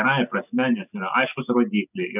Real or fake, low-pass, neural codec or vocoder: real; 3.6 kHz; none